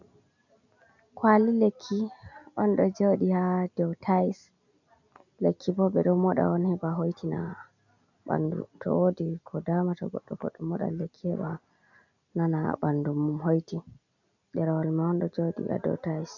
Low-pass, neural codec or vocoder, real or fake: 7.2 kHz; none; real